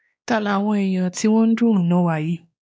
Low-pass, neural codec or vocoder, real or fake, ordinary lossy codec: none; codec, 16 kHz, 2 kbps, X-Codec, WavLM features, trained on Multilingual LibriSpeech; fake; none